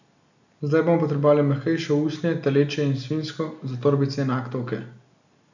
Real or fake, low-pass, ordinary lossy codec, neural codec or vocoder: real; 7.2 kHz; none; none